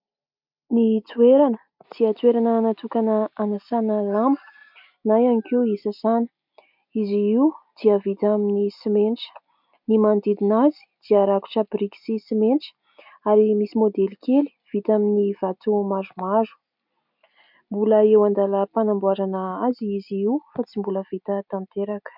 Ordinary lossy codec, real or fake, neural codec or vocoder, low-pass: MP3, 48 kbps; real; none; 5.4 kHz